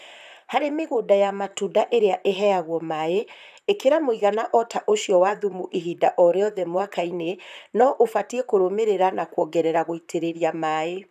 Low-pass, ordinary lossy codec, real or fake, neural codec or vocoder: 14.4 kHz; none; fake; vocoder, 44.1 kHz, 128 mel bands, Pupu-Vocoder